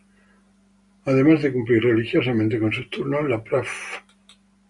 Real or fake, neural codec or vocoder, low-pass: real; none; 10.8 kHz